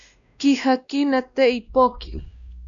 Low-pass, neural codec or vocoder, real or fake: 7.2 kHz; codec, 16 kHz, 1 kbps, X-Codec, WavLM features, trained on Multilingual LibriSpeech; fake